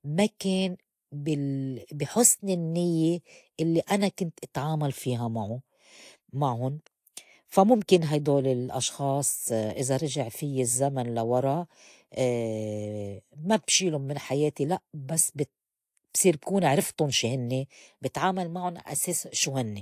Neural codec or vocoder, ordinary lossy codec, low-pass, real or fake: none; AAC, 64 kbps; 14.4 kHz; real